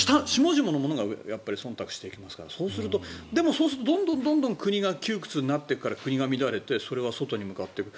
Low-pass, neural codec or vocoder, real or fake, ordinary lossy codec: none; none; real; none